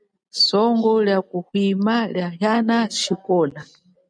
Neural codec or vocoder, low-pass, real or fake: none; 9.9 kHz; real